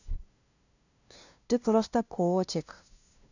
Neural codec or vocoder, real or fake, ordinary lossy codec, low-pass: codec, 16 kHz, 0.5 kbps, FunCodec, trained on LibriTTS, 25 frames a second; fake; none; 7.2 kHz